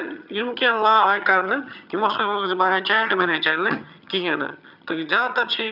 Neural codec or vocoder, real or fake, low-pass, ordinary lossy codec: vocoder, 22.05 kHz, 80 mel bands, HiFi-GAN; fake; 5.4 kHz; none